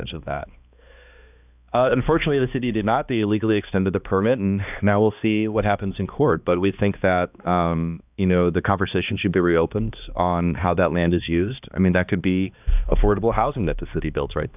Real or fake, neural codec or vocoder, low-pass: fake; codec, 16 kHz, 2 kbps, X-Codec, HuBERT features, trained on balanced general audio; 3.6 kHz